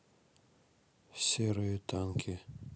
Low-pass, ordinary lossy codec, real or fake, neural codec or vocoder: none; none; real; none